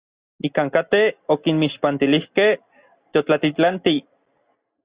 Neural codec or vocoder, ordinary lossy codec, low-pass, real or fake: none; Opus, 64 kbps; 3.6 kHz; real